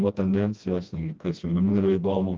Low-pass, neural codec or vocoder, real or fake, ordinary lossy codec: 7.2 kHz; codec, 16 kHz, 1 kbps, FreqCodec, smaller model; fake; Opus, 32 kbps